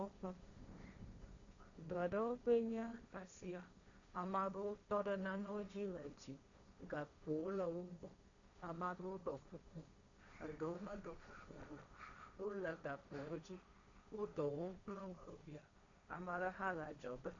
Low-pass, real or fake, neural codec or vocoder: 7.2 kHz; fake; codec, 16 kHz, 1.1 kbps, Voila-Tokenizer